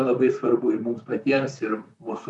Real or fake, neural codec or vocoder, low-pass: fake; vocoder, 44.1 kHz, 128 mel bands, Pupu-Vocoder; 10.8 kHz